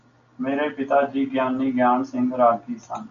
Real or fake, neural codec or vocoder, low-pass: real; none; 7.2 kHz